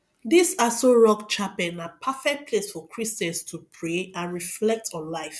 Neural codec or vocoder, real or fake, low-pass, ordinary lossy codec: none; real; none; none